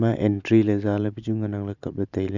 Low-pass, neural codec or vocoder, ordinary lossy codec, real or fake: 7.2 kHz; vocoder, 44.1 kHz, 128 mel bands every 512 samples, BigVGAN v2; none; fake